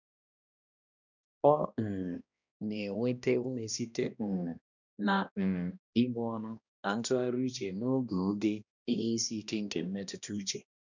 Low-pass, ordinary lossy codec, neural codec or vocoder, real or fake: 7.2 kHz; none; codec, 16 kHz, 1 kbps, X-Codec, HuBERT features, trained on balanced general audio; fake